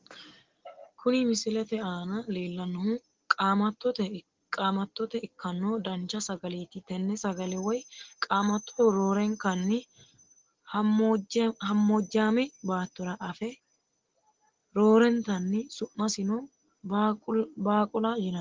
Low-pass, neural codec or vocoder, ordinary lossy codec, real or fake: 7.2 kHz; none; Opus, 16 kbps; real